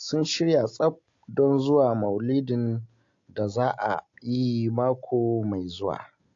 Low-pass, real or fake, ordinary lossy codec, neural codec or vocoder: 7.2 kHz; real; AAC, 48 kbps; none